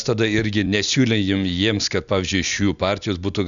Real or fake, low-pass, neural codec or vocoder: real; 7.2 kHz; none